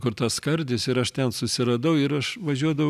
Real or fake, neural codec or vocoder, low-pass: real; none; 14.4 kHz